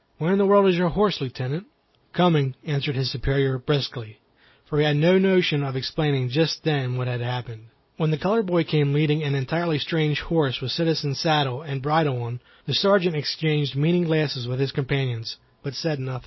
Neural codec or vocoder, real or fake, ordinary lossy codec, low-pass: none; real; MP3, 24 kbps; 7.2 kHz